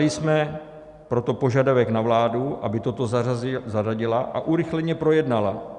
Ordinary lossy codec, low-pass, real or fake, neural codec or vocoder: Opus, 64 kbps; 10.8 kHz; real; none